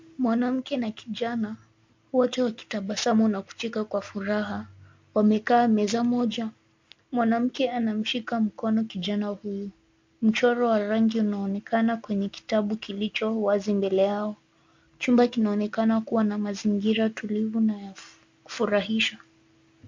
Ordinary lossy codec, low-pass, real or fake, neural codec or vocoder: MP3, 48 kbps; 7.2 kHz; real; none